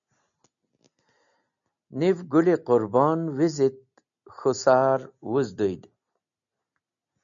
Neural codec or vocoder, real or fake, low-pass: none; real; 7.2 kHz